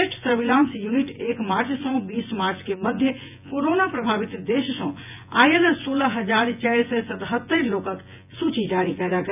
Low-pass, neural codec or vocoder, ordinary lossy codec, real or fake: 3.6 kHz; vocoder, 24 kHz, 100 mel bands, Vocos; none; fake